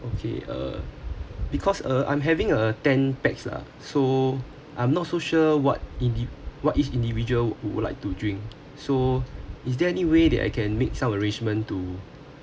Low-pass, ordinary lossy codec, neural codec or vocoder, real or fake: none; none; none; real